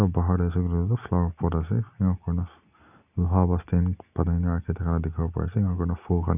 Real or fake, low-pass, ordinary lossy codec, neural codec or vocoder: real; 3.6 kHz; none; none